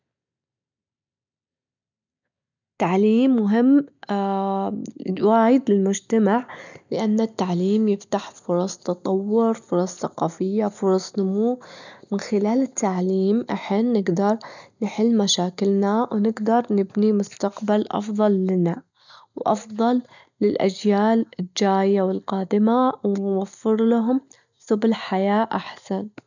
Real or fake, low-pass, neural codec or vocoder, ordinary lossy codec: real; 7.2 kHz; none; none